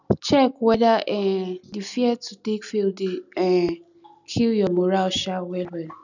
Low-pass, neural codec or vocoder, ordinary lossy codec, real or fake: 7.2 kHz; none; none; real